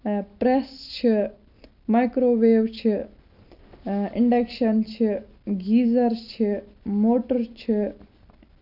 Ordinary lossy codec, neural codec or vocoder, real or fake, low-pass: none; none; real; 5.4 kHz